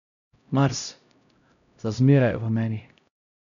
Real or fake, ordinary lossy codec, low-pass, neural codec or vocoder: fake; none; 7.2 kHz; codec, 16 kHz, 0.5 kbps, X-Codec, HuBERT features, trained on LibriSpeech